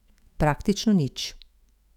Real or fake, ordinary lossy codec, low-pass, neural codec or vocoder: fake; none; 19.8 kHz; autoencoder, 48 kHz, 128 numbers a frame, DAC-VAE, trained on Japanese speech